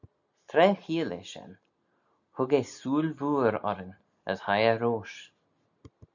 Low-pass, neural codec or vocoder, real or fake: 7.2 kHz; none; real